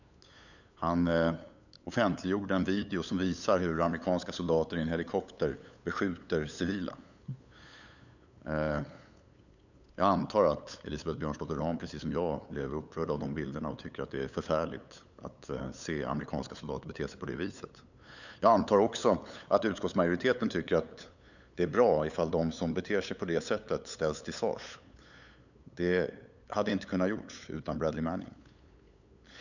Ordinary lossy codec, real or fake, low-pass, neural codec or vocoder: none; fake; 7.2 kHz; codec, 16 kHz, 8 kbps, FunCodec, trained on LibriTTS, 25 frames a second